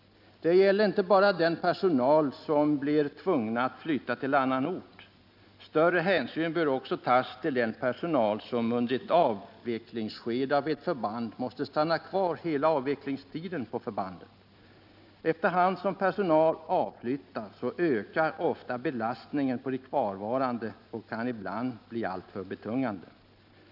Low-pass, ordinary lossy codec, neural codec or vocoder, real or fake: 5.4 kHz; none; none; real